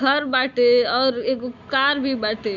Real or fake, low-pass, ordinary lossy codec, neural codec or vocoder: real; 7.2 kHz; none; none